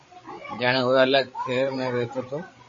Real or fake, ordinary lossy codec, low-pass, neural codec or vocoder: fake; MP3, 32 kbps; 7.2 kHz; codec, 16 kHz, 8 kbps, FreqCodec, larger model